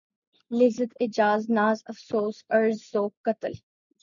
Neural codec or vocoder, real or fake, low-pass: none; real; 7.2 kHz